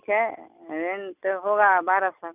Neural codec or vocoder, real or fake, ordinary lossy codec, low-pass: none; real; none; 3.6 kHz